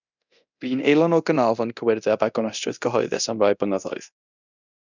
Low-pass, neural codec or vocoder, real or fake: 7.2 kHz; codec, 24 kHz, 0.9 kbps, DualCodec; fake